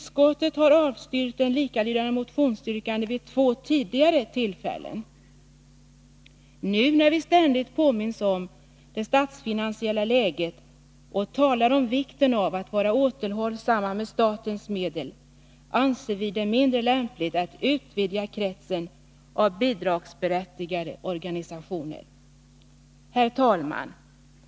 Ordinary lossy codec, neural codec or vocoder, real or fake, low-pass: none; none; real; none